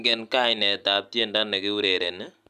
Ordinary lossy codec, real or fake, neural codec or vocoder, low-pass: none; real; none; 14.4 kHz